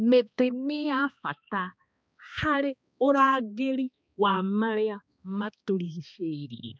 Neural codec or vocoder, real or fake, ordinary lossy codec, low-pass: codec, 16 kHz, 2 kbps, X-Codec, HuBERT features, trained on balanced general audio; fake; none; none